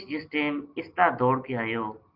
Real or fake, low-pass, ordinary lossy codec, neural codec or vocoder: real; 5.4 kHz; Opus, 24 kbps; none